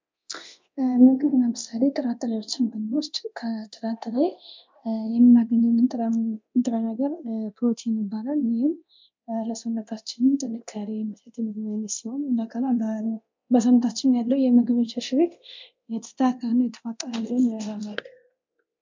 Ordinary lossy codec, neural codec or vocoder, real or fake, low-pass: MP3, 64 kbps; codec, 24 kHz, 0.9 kbps, DualCodec; fake; 7.2 kHz